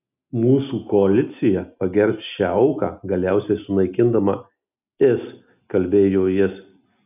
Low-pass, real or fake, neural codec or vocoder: 3.6 kHz; real; none